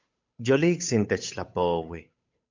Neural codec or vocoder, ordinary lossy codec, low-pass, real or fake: codec, 16 kHz, 8 kbps, FunCodec, trained on Chinese and English, 25 frames a second; MP3, 64 kbps; 7.2 kHz; fake